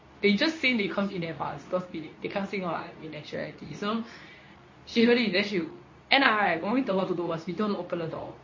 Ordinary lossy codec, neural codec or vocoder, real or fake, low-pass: MP3, 32 kbps; codec, 24 kHz, 0.9 kbps, WavTokenizer, medium speech release version 2; fake; 7.2 kHz